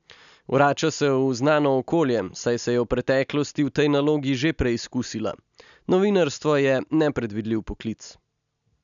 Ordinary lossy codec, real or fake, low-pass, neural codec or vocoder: none; real; 7.2 kHz; none